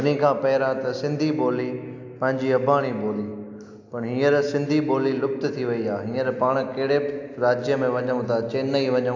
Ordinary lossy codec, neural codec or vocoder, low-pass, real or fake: AAC, 48 kbps; none; 7.2 kHz; real